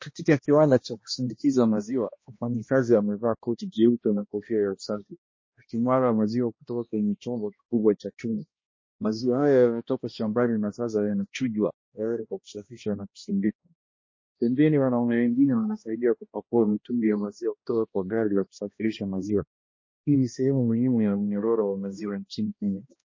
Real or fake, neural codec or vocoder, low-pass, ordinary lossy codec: fake; codec, 16 kHz, 1 kbps, X-Codec, HuBERT features, trained on balanced general audio; 7.2 kHz; MP3, 32 kbps